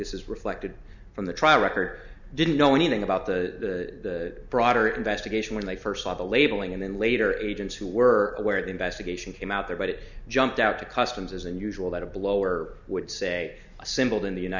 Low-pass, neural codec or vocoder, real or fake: 7.2 kHz; none; real